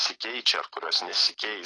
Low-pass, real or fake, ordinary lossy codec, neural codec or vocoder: 10.8 kHz; real; AAC, 32 kbps; none